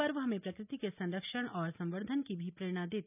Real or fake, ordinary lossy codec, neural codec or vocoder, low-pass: real; none; none; 3.6 kHz